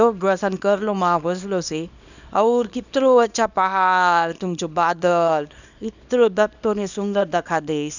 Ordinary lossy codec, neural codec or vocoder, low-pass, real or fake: none; codec, 24 kHz, 0.9 kbps, WavTokenizer, small release; 7.2 kHz; fake